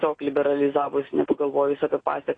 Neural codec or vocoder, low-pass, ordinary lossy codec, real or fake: none; 9.9 kHz; AAC, 32 kbps; real